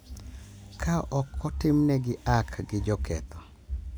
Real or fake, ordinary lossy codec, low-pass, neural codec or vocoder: real; none; none; none